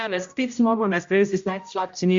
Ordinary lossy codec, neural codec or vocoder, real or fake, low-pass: MP3, 48 kbps; codec, 16 kHz, 0.5 kbps, X-Codec, HuBERT features, trained on general audio; fake; 7.2 kHz